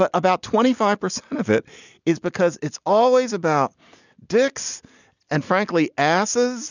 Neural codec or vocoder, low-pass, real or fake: none; 7.2 kHz; real